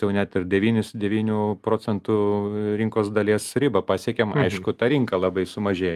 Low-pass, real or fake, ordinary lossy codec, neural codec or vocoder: 14.4 kHz; real; Opus, 32 kbps; none